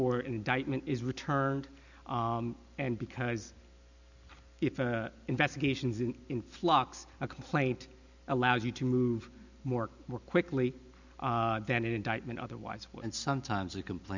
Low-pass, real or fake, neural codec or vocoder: 7.2 kHz; real; none